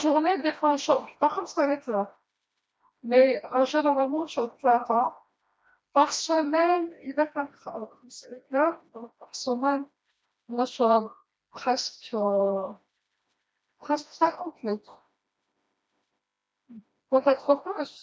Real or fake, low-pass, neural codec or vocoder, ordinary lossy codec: fake; none; codec, 16 kHz, 1 kbps, FreqCodec, smaller model; none